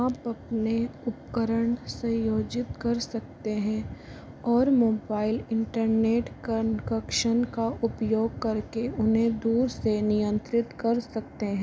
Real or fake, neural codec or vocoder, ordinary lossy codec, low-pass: real; none; none; none